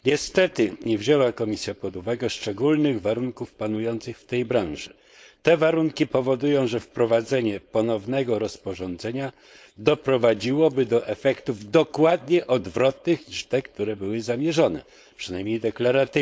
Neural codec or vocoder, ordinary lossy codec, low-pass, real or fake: codec, 16 kHz, 4.8 kbps, FACodec; none; none; fake